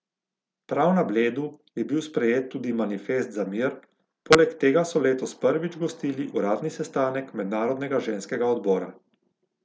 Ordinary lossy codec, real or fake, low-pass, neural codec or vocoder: none; real; none; none